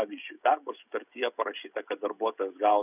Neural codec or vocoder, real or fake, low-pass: codec, 16 kHz, 16 kbps, FreqCodec, smaller model; fake; 3.6 kHz